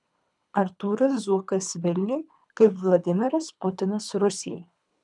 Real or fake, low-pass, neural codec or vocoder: fake; 10.8 kHz; codec, 24 kHz, 3 kbps, HILCodec